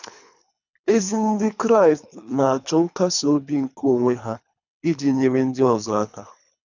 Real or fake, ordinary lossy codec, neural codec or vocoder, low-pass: fake; none; codec, 24 kHz, 3 kbps, HILCodec; 7.2 kHz